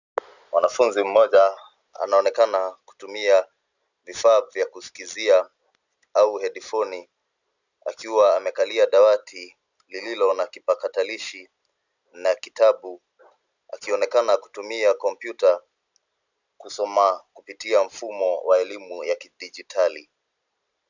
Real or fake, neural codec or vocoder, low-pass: real; none; 7.2 kHz